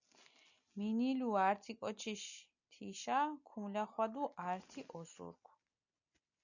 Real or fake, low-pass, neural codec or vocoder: real; 7.2 kHz; none